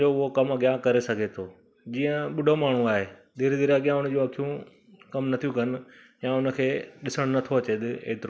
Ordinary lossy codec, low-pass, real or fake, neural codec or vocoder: none; none; real; none